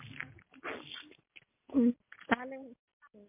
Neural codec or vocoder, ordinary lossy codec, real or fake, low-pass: none; MP3, 24 kbps; real; 3.6 kHz